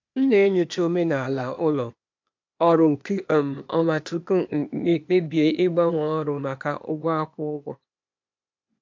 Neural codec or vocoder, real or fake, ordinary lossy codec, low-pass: codec, 16 kHz, 0.8 kbps, ZipCodec; fake; MP3, 64 kbps; 7.2 kHz